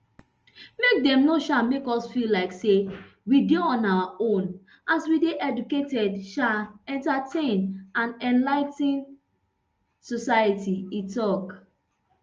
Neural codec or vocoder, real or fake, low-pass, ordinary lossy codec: none; real; 7.2 kHz; Opus, 24 kbps